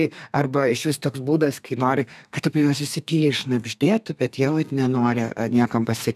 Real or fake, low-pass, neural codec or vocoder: fake; 14.4 kHz; codec, 32 kHz, 1.9 kbps, SNAC